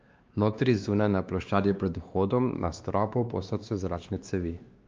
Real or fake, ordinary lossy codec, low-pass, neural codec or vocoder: fake; Opus, 24 kbps; 7.2 kHz; codec, 16 kHz, 2 kbps, X-Codec, WavLM features, trained on Multilingual LibriSpeech